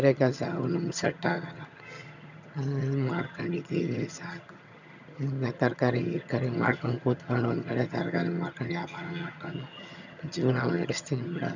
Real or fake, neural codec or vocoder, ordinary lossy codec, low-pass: fake; vocoder, 22.05 kHz, 80 mel bands, HiFi-GAN; none; 7.2 kHz